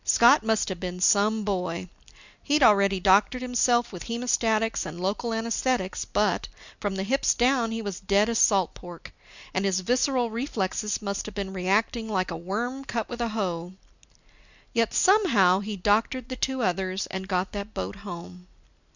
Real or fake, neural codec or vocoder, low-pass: real; none; 7.2 kHz